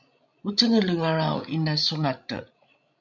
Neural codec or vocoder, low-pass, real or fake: codec, 16 kHz, 16 kbps, FreqCodec, larger model; 7.2 kHz; fake